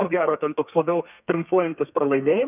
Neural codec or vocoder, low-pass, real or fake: codec, 32 kHz, 1.9 kbps, SNAC; 3.6 kHz; fake